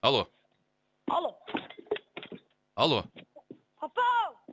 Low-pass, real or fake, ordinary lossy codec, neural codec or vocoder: none; real; none; none